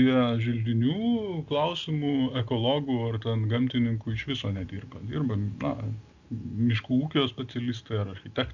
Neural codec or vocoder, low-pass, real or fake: none; 7.2 kHz; real